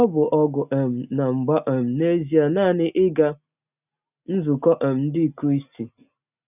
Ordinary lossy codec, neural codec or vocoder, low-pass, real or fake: none; none; 3.6 kHz; real